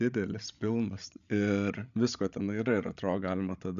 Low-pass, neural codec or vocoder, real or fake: 7.2 kHz; codec, 16 kHz, 16 kbps, FreqCodec, larger model; fake